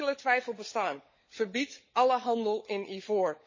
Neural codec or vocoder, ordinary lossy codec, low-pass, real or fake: codec, 16 kHz, 16 kbps, FunCodec, trained on LibriTTS, 50 frames a second; MP3, 32 kbps; 7.2 kHz; fake